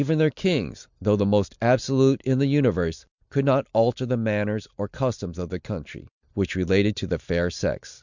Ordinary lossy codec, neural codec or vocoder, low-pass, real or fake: Opus, 64 kbps; none; 7.2 kHz; real